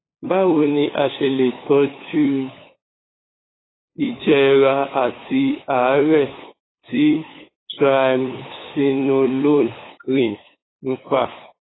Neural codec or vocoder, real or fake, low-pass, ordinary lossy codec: codec, 16 kHz, 8 kbps, FunCodec, trained on LibriTTS, 25 frames a second; fake; 7.2 kHz; AAC, 16 kbps